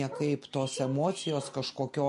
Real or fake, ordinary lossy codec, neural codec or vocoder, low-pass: real; MP3, 48 kbps; none; 14.4 kHz